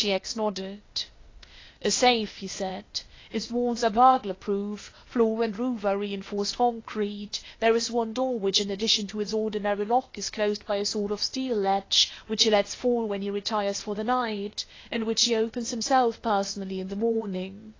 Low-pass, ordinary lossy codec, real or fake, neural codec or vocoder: 7.2 kHz; AAC, 32 kbps; fake; codec, 16 kHz, 0.8 kbps, ZipCodec